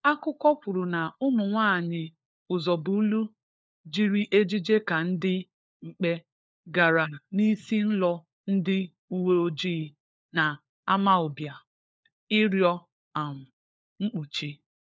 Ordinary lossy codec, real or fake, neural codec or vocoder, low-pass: none; fake; codec, 16 kHz, 4 kbps, FunCodec, trained on LibriTTS, 50 frames a second; none